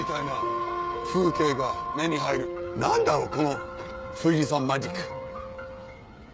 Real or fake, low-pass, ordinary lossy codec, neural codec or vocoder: fake; none; none; codec, 16 kHz, 16 kbps, FreqCodec, smaller model